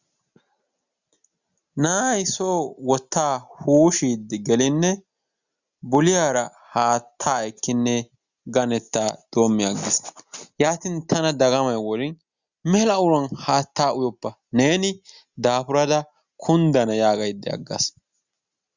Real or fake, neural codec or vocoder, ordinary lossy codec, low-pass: real; none; Opus, 64 kbps; 7.2 kHz